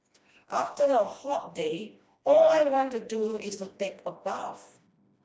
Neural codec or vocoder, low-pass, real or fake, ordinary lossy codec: codec, 16 kHz, 1 kbps, FreqCodec, smaller model; none; fake; none